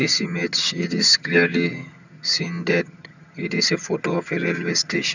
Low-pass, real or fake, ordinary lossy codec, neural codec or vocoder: 7.2 kHz; fake; none; vocoder, 22.05 kHz, 80 mel bands, HiFi-GAN